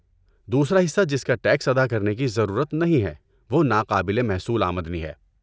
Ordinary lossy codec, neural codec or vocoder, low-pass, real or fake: none; none; none; real